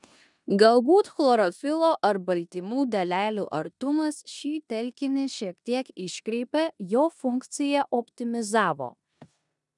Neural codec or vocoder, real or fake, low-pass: codec, 16 kHz in and 24 kHz out, 0.9 kbps, LongCat-Audio-Codec, four codebook decoder; fake; 10.8 kHz